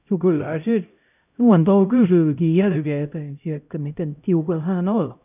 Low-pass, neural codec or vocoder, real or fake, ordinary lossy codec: 3.6 kHz; codec, 16 kHz, 0.5 kbps, X-Codec, HuBERT features, trained on LibriSpeech; fake; none